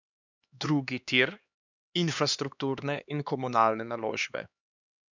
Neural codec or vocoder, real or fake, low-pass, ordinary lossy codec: codec, 16 kHz, 2 kbps, X-Codec, HuBERT features, trained on LibriSpeech; fake; 7.2 kHz; none